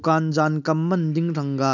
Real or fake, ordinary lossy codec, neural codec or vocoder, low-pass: real; none; none; 7.2 kHz